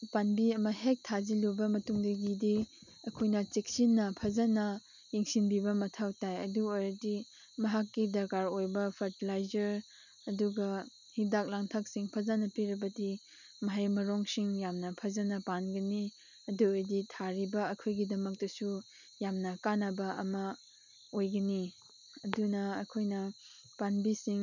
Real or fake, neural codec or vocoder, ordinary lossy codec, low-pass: real; none; none; 7.2 kHz